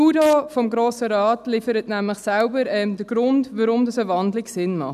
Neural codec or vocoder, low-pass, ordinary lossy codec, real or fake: none; 14.4 kHz; none; real